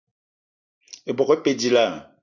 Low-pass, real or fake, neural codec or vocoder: 7.2 kHz; real; none